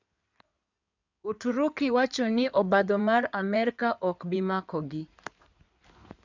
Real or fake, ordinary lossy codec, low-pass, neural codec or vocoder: fake; none; 7.2 kHz; codec, 16 kHz in and 24 kHz out, 2.2 kbps, FireRedTTS-2 codec